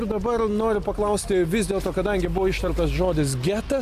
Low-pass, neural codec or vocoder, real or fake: 14.4 kHz; none; real